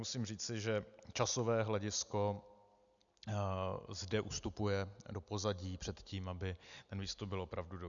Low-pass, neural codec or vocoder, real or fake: 7.2 kHz; none; real